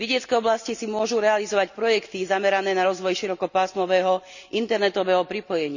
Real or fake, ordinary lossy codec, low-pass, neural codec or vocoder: real; none; 7.2 kHz; none